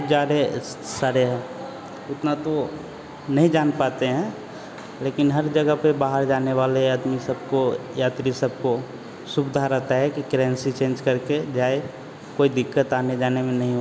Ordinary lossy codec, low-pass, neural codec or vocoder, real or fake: none; none; none; real